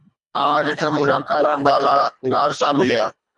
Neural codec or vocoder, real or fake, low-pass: codec, 24 kHz, 1.5 kbps, HILCodec; fake; 10.8 kHz